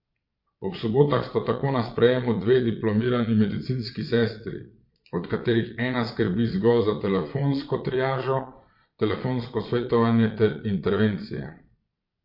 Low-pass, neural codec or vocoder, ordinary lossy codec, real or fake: 5.4 kHz; vocoder, 22.05 kHz, 80 mel bands, Vocos; MP3, 32 kbps; fake